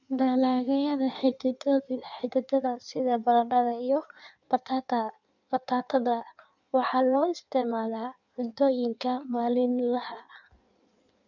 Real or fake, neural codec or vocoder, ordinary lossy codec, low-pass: fake; codec, 16 kHz in and 24 kHz out, 1.1 kbps, FireRedTTS-2 codec; none; 7.2 kHz